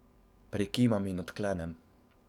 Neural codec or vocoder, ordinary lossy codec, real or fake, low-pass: codec, 44.1 kHz, 7.8 kbps, DAC; none; fake; 19.8 kHz